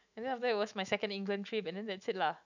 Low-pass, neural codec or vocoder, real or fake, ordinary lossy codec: 7.2 kHz; autoencoder, 48 kHz, 128 numbers a frame, DAC-VAE, trained on Japanese speech; fake; none